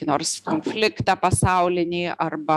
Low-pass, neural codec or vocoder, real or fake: 14.4 kHz; autoencoder, 48 kHz, 128 numbers a frame, DAC-VAE, trained on Japanese speech; fake